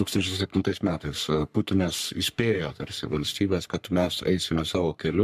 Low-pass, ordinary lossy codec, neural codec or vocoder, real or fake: 14.4 kHz; MP3, 96 kbps; codec, 44.1 kHz, 3.4 kbps, Pupu-Codec; fake